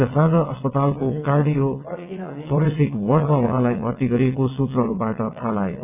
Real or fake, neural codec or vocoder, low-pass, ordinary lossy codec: fake; vocoder, 22.05 kHz, 80 mel bands, WaveNeXt; 3.6 kHz; none